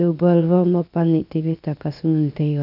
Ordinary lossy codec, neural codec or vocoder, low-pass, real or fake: none; codec, 16 kHz, 0.7 kbps, FocalCodec; 5.4 kHz; fake